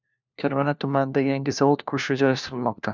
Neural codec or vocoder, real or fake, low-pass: codec, 16 kHz, 1 kbps, FunCodec, trained on LibriTTS, 50 frames a second; fake; 7.2 kHz